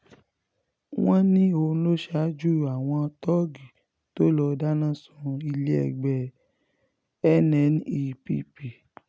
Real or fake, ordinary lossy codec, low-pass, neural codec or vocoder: real; none; none; none